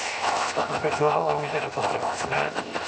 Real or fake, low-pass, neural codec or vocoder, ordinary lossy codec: fake; none; codec, 16 kHz, 0.7 kbps, FocalCodec; none